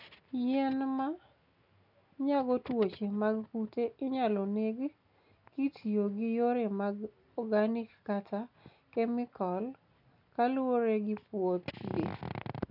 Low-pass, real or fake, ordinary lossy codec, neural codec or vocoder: 5.4 kHz; real; none; none